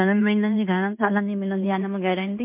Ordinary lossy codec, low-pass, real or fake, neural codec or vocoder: MP3, 32 kbps; 3.6 kHz; fake; codec, 16 kHz in and 24 kHz out, 0.9 kbps, LongCat-Audio-Codec, fine tuned four codebook decoder